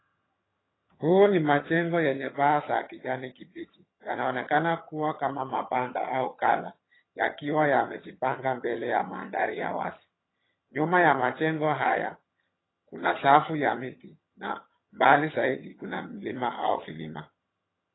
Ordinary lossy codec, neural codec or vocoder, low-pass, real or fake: AAC, 16 kbps; vocoder, 22.05 kHz, 80 mel bands, HiFi-GAN; 7.2 kHz; fake